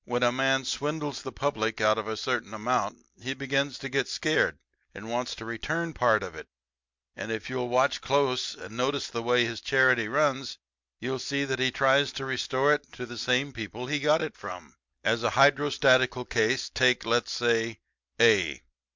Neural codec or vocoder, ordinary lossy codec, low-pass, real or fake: none; MP3, 64 kbps; 7.2 kHz; real